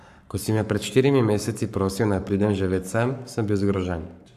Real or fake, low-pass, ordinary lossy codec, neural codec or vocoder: fake; 14.4 kHz; none; codec, 44.1 kHz, 7.8 kbps, Pupu-Codec